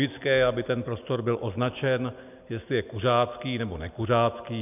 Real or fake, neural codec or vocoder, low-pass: real; none; 3.6 kHz